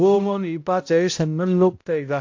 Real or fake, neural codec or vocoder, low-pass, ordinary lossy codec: fake; codec, 16 kHz, 0.5 kbps, X-Codec, HuBERT features, trained on balanced general audio; 7.2 kHz; AAC, 48 kbps